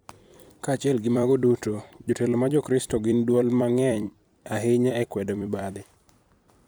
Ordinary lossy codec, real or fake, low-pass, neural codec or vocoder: none; fake; none; vocoder, 44.1 kHz, 128 mel bands every 256 samples, BigVGAN v2